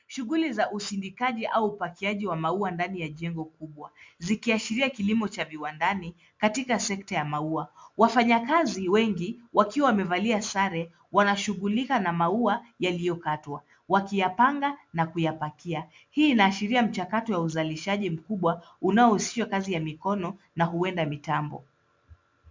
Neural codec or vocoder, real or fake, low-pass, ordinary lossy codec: none; real; 7.2 kHz; MP3, 64 kbps